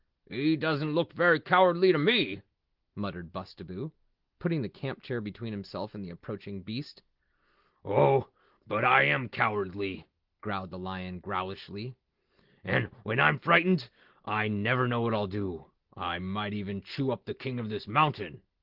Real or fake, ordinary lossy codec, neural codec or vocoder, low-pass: real; Opus, 16 kbps; none; 5.4 kHz